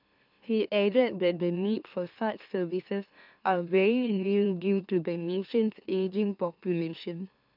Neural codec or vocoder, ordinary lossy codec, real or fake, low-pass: autoencoder, 44.1 kHz, a latent of 192 numbers a frame, MeloTTS; none; fake; 5.4 kHz